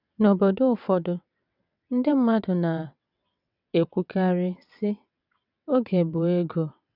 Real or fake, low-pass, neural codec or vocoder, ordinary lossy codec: fake; 5.4 kHz; vocoder, 22.05 kHz, 80 mel bands, WaveNeXt; none